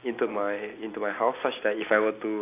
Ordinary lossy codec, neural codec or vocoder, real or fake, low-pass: AAC, 24 kbps; none; real; 3.6 kHz